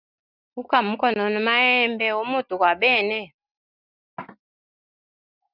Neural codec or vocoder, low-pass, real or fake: none; 5.4 kHz; real